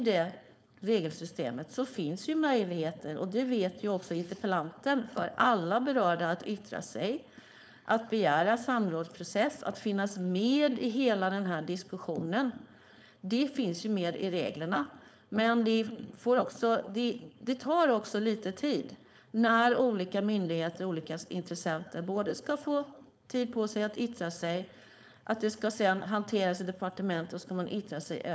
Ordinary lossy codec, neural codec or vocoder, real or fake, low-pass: none; codec, 16 kHz, 4.8 kbps, FACodec; fake; none